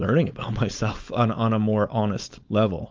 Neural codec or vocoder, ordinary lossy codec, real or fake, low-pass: codec, 16 kHz, 4.8 kbps, FACodec; Opus, 32 kbps; fake; 7.2 kHz